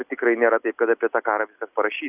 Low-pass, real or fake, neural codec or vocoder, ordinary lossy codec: 3.6 kHz; real; none; Opus, 64 kbps